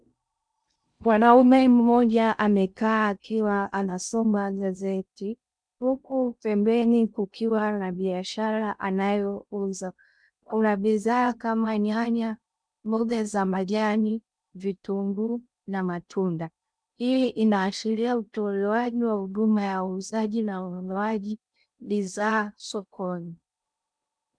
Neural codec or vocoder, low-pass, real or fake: codec, 16 kHz in and 24 kHz out, 0.6 kbps, FocalCodec, streaming, 2048 codes; 9.9 kHz; fake